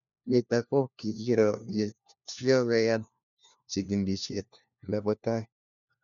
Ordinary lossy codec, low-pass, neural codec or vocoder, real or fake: none; 7.2 kHz; codec, 16 kHz, 1 kbps, FunCodec, trained on LibriTTS, 50 frames a second; fake